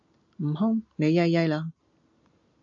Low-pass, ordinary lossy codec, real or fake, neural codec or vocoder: 7.2 kHz; AAC, 64 kbps; real; none